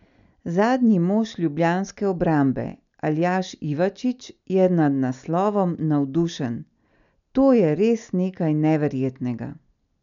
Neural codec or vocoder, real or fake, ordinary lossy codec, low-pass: none; real; none; 7.2 kHz